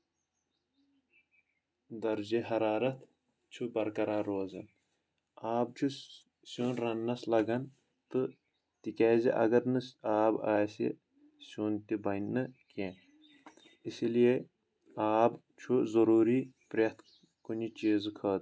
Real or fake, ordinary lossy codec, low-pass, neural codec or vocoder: real; none; none; none